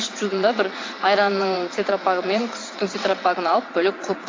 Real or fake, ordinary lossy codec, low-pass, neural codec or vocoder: fake; AAC, 32 kbps; 7.2 kHz; vocoder, 22.05 kHz, 80 mel bands, WaveNeXt